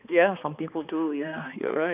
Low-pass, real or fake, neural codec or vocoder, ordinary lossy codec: 3.6 kHz; fake; codec, 16 kHz, 2 kbps, X-Codec, HuBERT features, trained on balanced general audio; none